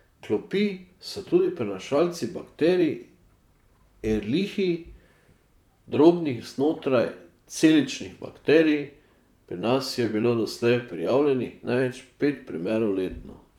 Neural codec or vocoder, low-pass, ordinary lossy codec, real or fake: vocoder, 44.1 kHz, 128 mel bands, Pupu-Vocoder; 19.8 kHz; none; fake